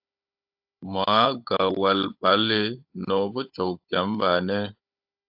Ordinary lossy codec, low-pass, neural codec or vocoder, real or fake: AAC, 48 kbps; 5.4 kHz; codec, 16 kHz, 16 kbps, FunCodec, trained on Chinese and English, 50 frames a second; fake